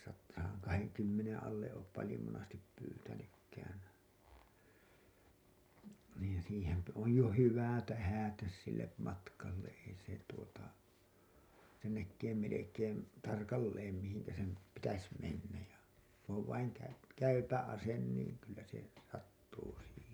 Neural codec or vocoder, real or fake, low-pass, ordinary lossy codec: none; real; none; none